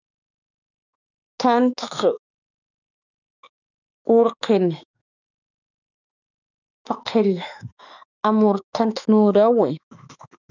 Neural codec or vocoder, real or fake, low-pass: autoencoder, 48 kHz, 32 numbers a frame, DAC-VAE, trained on Japanese speech; fake; 7.2 kHz